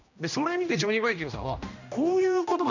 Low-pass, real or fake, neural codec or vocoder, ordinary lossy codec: 7.2 kHz; fake; codec, 16 kHz, 1 kbps, X-Codec, HuBERT features, trained on general audio; none